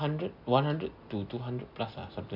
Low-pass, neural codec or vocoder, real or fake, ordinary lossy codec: 5.4 kHz; none; real; none